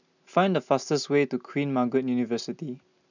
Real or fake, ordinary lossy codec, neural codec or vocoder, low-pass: real; none; none; 7.2 kHz